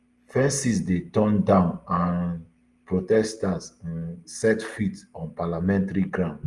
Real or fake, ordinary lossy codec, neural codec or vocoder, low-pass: real; Opus, 32 kbps; none; 10.8 kHz